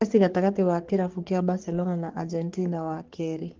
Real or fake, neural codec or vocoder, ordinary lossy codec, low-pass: fake; codec, 44.1 kHz, 3.4 kbps, Pupu-Codec; Opus, 16 kbps; 7.2 kHz